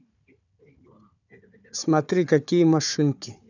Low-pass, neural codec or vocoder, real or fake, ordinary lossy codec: 7.2 kHz; codec, 16 kHz, 4 kbps, FunCodec, trained on Chinese and English, 50 frames a second; fake; none